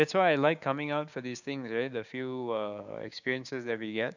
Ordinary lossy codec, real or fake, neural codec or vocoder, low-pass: none; fake; codec, 16 kHz, 6 kbps, DAC; 7.2 kHz